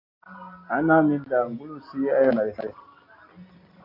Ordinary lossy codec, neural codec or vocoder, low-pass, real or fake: AAC, 32 kbps; none; 5.4 kHz; real